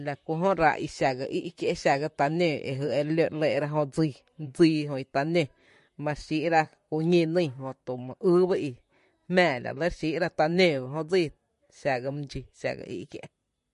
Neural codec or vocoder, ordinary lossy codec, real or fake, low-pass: vocoder, 44.1 kHz, 128 mel bands, Pupu-Vocoder; MP3, 48 kbps; fake; 14.4 kHz